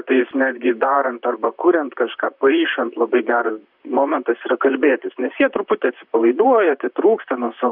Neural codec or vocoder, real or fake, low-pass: vocoder, 44.1 kHz, 128 mel bands, Pupu-Vocoder; fake; 5.4 kHz